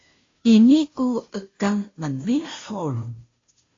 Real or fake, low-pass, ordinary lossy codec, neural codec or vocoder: fake; 7.2 kHz; AAC, 32 kbps; codec, 16 kHz, 0.5 kbps, FunCodec, trained on Chinese and English, 25 frames a second